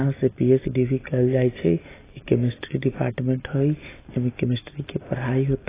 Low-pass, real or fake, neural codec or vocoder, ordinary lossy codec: 3.6 kHz; real; none; AAC, 16 kbps